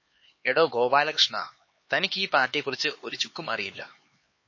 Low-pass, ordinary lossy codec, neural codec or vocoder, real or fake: 7.2 kHz; MP3, 32 kbps; codec, 16 kHz, 4 kbps, X-Codec, HuBERT features, trained on LibriSpeech; fake